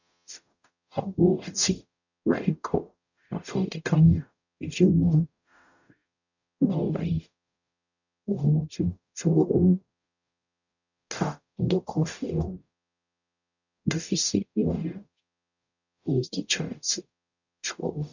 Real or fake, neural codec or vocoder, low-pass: fake; codec, 44.1 kHz, 0.9 kbps, DAC; 7.2 kHz